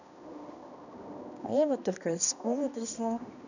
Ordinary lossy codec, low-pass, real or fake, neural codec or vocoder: none; 7.2 kHz; fake; codec, 16 kHz, 1 kbps, X-Codec, HuBERT features, trained on balanced general audio